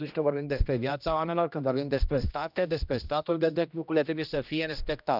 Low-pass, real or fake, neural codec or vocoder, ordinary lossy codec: 5.4 kHz; fake; codec, 16 kHz, 1 kbps, X-Codec, HuBERT features, trained on general audio; none